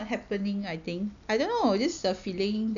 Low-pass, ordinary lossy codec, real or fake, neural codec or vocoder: 7.2 kHz; none; real; none